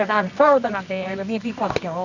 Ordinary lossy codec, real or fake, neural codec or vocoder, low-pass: none; fake; codec, 24 kHz, 0.9 kbps, WavTokenizer, medium music audio release; 7.2 kHz